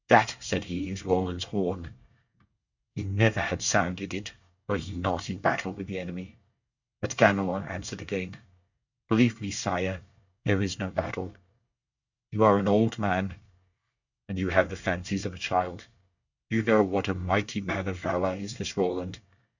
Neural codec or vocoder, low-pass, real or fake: codec, 24 kHz, 1 kbps, SNAC; 7.2 kHz; fake